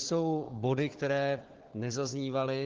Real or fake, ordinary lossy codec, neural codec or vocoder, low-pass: fake; Opus, 16 kbps; codec, 16 kHz, 4 kbps, FunCodec, trained on Chinese and English, 50 frames a second; 7.2 kHz